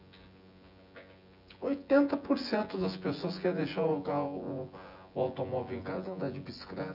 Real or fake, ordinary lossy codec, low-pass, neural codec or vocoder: fake; none; 5.4 kHz; vocoder, 24 kHz, 100 mel bands, Vocos